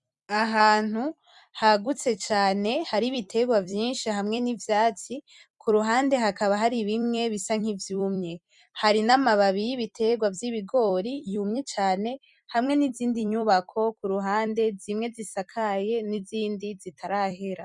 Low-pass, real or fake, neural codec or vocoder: 10.8 kHz; fake; vocoder, 44.1 kHz, 128 mel bands every 256 samples, BigVGAN v2